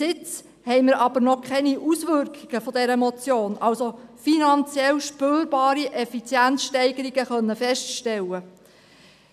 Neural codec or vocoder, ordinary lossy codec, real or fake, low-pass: none; none; real; 14.4 kHz